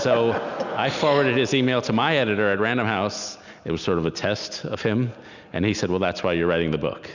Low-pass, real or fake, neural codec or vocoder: 7.2 kHz; real; none